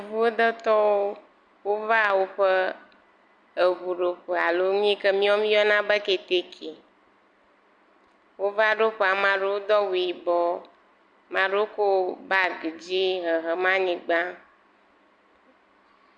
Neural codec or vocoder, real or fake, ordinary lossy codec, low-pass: vocoder, 44.1 kHz, 128 mel bands every 256 samples, BigVGAN v2; fake; MP3, 64 kbps; 9.9 kHz